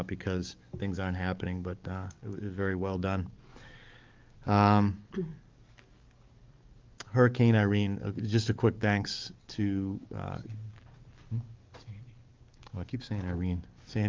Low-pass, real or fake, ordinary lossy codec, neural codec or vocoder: 7.2 kHz; fake; Opus, 32 kbps; codec, 44.1 kHz, 7.8 kbps, DAC